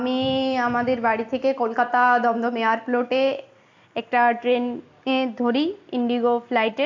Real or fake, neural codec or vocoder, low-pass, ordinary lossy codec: real; none; 7.2 kHz; AAC, 48 kbps